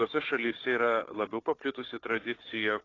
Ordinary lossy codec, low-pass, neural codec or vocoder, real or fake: AAC, 32 kbps; 7.2 kHz; codec, 16 kHz, 4 kbps, FunCodec, trained on Chinese and English, 50 frames a second; fake